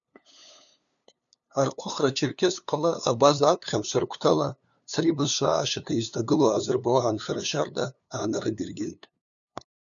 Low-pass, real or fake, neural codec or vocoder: 7.2 kHz; fake; codec, 16 kHz, 2 kbps, FunCodec, trained on LibriTTS, 25 frames a second